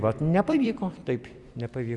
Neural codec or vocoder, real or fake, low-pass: vocoder, 44.1 kHz, 128 mel bands every 256 samples, BigVGAN v2; fake; 10.8 kHz